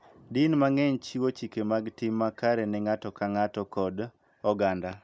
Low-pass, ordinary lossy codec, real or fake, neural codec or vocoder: none; none; real; none